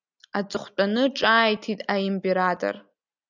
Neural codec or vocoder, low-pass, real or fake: none; 7.2 kHz; real